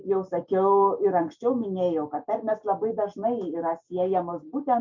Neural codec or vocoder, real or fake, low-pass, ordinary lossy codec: none; real; 7.2 kHz; MP3, 64 kbps